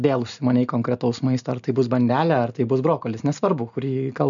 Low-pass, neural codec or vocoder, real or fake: 7.2 kHz; none; real